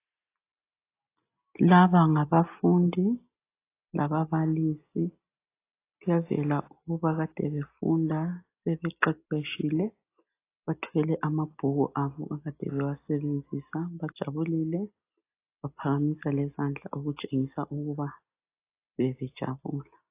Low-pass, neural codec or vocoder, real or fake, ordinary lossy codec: 3.6 kHz; none; real; AAC, 24 kbps